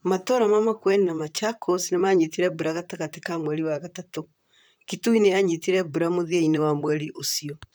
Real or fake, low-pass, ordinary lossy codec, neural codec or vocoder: fake; none; none; vocoder, 44.1 kHz, 128 mel bands, Pupu-Vocoder